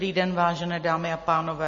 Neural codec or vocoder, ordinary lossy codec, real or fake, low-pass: none; MP3, 32 kbps; real; 7.2 kHz